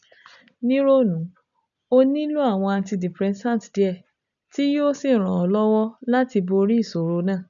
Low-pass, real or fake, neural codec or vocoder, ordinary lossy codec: 7.2 kHz; real; none; none